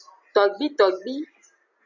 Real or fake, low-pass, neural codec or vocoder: real; 7.2 kHz; none